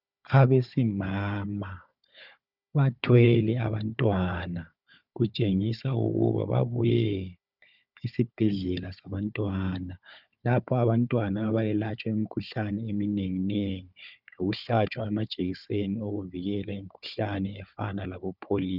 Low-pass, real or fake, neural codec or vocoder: 5.4 kHz; fake; codec, 16 kHz, 4 kbps, FunCodec, trained on Chinese and English, 50 frames a second